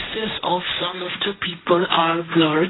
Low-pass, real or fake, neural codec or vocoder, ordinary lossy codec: 7.2 kHz; fake; codec, 16 kHz, 1.1 kbps, Voila-Tokenizer; AAC, 16 kbps